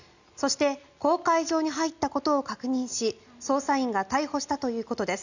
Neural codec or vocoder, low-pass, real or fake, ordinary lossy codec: none; 7.2 kHz; real; none